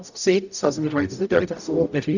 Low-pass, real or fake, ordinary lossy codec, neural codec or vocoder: 7.2 kHz; fake; none; codec, 44.1 kHz, 0.9 kbps, DAC